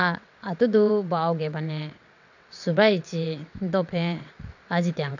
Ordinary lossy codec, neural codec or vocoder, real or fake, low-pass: none; vocoder, 22.05 kHz, 80 mel bands, WaveNeXt; fake; 7.2 kHz